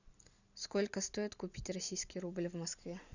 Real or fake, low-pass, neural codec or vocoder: real; 7.2 kHz; none